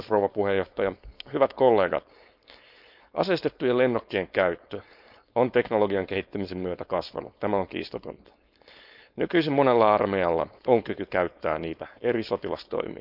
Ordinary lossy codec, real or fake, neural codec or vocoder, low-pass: none; fake; codec, 16 kHz, 4.8 kbps, FACodec; 5.4 kHz